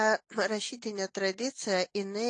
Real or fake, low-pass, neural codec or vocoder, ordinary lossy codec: real; 9.9 kHz; none; AAC, 48 kbps